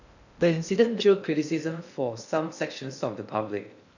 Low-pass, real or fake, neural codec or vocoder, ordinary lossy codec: 7.2 kHz; fake; codec, 16 kHz in and 24 kHz out, 0.6 kbps, FocalCodec, streaming, 2048 codes; none